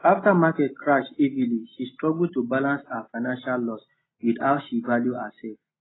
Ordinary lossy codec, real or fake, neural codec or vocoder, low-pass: AAC, 16 kbps; real; none; 7.2 kHz